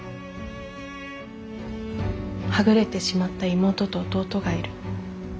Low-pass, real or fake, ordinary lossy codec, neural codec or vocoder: none; real; none; none